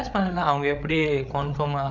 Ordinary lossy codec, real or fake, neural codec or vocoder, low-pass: none; fake; codec, 16 kHz, 8 kbps, FreqCodec, larger model; 7.2 kHz